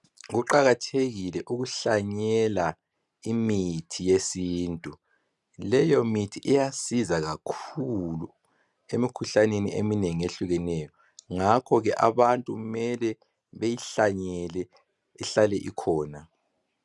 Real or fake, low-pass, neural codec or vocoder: real; 10.8 kHz; none